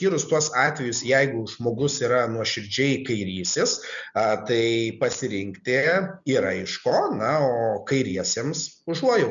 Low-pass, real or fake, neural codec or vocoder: 7.2 kHz; real; none